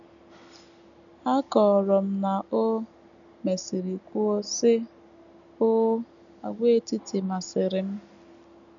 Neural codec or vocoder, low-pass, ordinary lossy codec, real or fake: none; 7.2 kHz; none; real